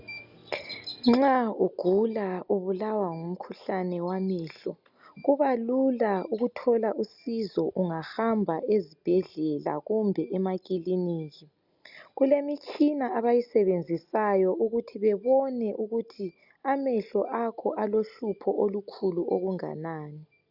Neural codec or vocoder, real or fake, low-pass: none; real; 5.4 kHz